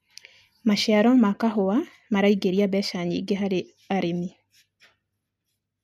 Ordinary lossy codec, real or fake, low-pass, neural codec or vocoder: none; real; 14.4 kHz; none